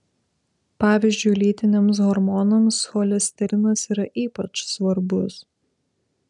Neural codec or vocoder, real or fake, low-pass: none; real; 10.8 kHz